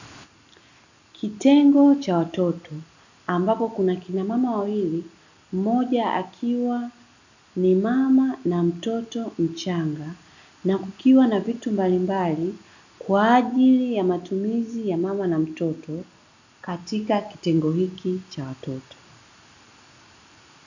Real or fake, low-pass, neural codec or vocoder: real; 7.2 kHz; none